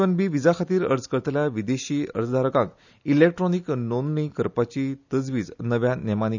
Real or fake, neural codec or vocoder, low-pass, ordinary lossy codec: real; none; 7.2 kHz; none